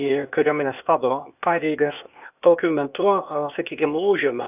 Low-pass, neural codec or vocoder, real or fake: 3.6 kHz; codec, 16 kHz, 0.8 kbps, ZipCodec; fake